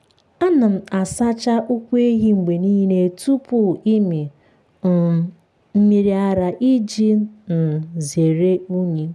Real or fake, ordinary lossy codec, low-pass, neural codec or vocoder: real; none; none; none